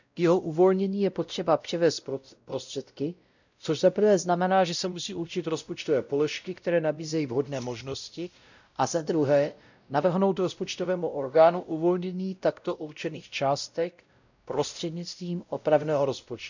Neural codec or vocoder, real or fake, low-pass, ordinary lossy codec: codec, 16 kHz, 0.5 kbps, X-Codec, WavLM features, trained on Multilingual LibriSpeech; fake; 7.2 kHz; none